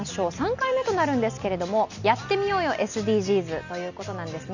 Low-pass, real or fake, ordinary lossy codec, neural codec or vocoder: 7.2 kHz; real; none; none